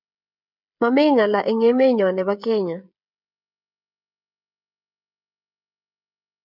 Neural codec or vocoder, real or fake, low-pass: codec, 16 kHz, 16 kbps, FreqCodec, smaller model; fake; 5.4 kHz